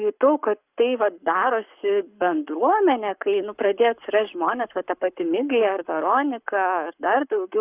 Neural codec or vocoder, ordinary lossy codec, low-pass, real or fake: codec, 44.1 kHz, 7.8 kbps, Pupu-Codec; Opus, 64 kbps; 3.6 kHz; fake